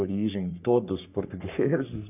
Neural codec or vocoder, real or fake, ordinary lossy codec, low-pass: codec, 44.1 kHz, 3.4 kbps, Pupu-Codec; fake; none; 3.6 kHz